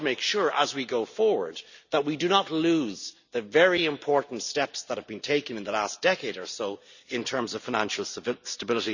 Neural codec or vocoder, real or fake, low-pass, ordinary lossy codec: none; real; 7.2 kHz; none